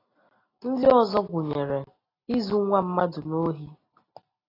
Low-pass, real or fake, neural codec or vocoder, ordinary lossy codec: 5.4 kHz; real; none; AAC, 24 kbps